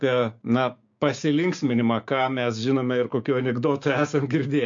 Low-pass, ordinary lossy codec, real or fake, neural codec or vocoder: 7.2 kHz; MP3, 48 kbps; fake; codec, 16 kHz, 6 kbps, DAC